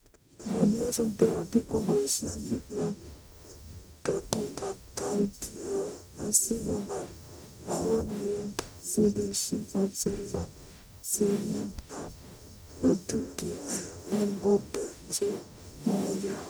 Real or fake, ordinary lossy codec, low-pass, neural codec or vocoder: fake; none; none; codec, 44.1 kHz, 0.9 kbps, DAC